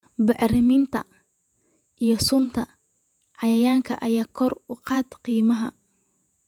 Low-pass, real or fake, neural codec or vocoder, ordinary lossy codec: 19.8 kHz; fake; vocoder, 48 kHz, 128 mel bands, Vocos; none